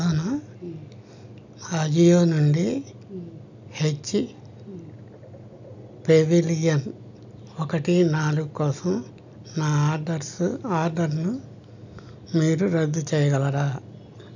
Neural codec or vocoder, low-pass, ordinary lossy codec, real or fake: none; 7.2 kHz; none; real